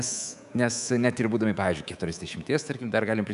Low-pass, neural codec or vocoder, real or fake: 10.8 kHz; codec, 24 kHz, 3.1 kbps, DualCodec; fake